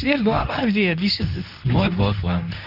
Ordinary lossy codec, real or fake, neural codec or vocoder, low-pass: AAC, 48 kbps; fake; codec, 24 kHz, 0.9 kbps, WavTokenizer, medium speech release version 2; 5.4 kHz